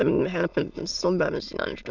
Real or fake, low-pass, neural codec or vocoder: fake; 7.2 kHz; autoencoder, 22.05 kHz, a latent of 192 numbers a frame, VITS, trained on many speakers